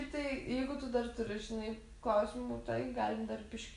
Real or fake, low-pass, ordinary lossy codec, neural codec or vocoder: real; 10.8 kHz; AAC, 96 kbps; none